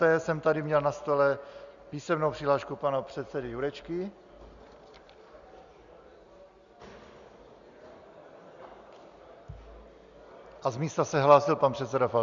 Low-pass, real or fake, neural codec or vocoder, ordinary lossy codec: 7.2 kHz; real; none; Opus, 64 kbps